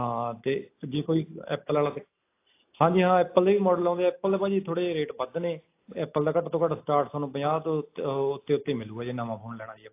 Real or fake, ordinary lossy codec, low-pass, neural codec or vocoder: real; AAC, 24 kbps; 3.6 kHz; none